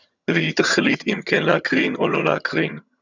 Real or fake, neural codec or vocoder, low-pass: fake; vocoder, 22.05 kHz, 80 mel bands, HiFi-GAN; 7.2 kHz